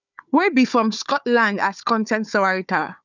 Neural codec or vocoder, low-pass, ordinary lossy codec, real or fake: codec, 16 kHz, 4 kbps, FunCodec, trained on Chinese and English, 50 frames a second; 7.2 kHz; none; fake